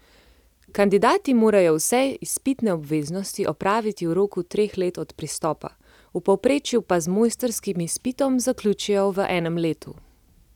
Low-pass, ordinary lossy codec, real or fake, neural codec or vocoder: 19.8 kHz; none; real; none